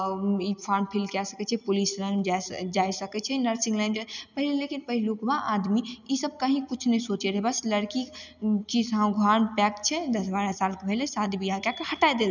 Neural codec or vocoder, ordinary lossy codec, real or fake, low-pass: none; none; real; 7.2 kHz